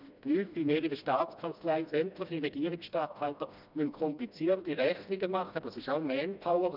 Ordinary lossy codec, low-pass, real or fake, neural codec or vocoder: none; 5.4 kHz; fake; codec, 16 kHz, 1 kbps, FreqCodec, smaller model